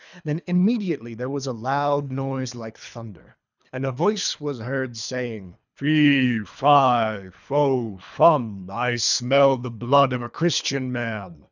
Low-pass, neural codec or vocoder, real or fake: 7.2 kHz; codec, 24 kHz, 3 kbps, HILCodec; fake